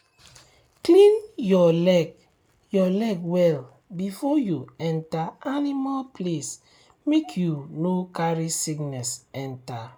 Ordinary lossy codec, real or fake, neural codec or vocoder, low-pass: none; real; none; none